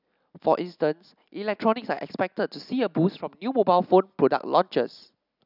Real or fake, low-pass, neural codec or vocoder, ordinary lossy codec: real; 5.4 kHz; none; none